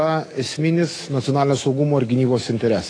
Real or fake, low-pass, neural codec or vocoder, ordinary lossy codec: fake; 9.9 kHz; autoencoder, 48 kHz, 128 numbers a frame, DAC-VAE, trained on Japanese speech; AAC, 32 kbps